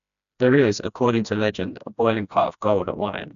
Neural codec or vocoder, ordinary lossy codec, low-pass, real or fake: codec, 16 kHz, 1 kbps, FreqCodec, smaller model; none; 7.2 kHz; fake